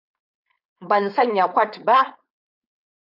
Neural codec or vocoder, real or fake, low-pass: codec, 16 kHz, 4.8 kbps, FACodec; fake; 5.4 kHz